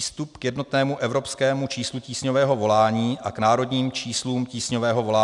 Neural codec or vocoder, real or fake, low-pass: none; real; 10.8 kHz